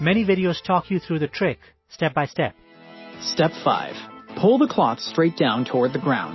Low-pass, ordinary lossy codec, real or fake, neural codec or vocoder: 7.2 kHz; MP3, 24 kbps; real; none